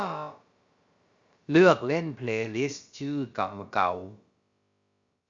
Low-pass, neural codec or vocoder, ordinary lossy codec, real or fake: 7.2 kHz; codec, 16 kHz, about 1 kbps, DyCAST, with the encoder's durations; Opus, 64 kbps; fake